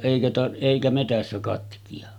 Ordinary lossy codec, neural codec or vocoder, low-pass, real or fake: none; none; 19.8 kHz; real